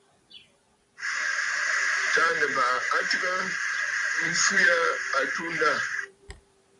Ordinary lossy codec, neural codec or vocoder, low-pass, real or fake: AAC, 48 kbps; vocoder, 44.1 kHz, 128 mel bands every 256 samples, BigVGAN v2; 10.8 kHz; fake